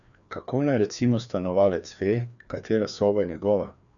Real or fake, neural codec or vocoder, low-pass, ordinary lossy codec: fake; codec, 16 kHz, 2 kbps, FreqCodec, larger model; 7.2 kHz; none